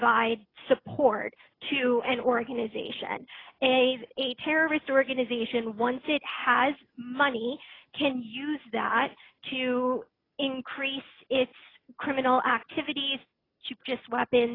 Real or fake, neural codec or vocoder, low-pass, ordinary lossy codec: real; none; 5.4 kHz; AAC, 24 kbps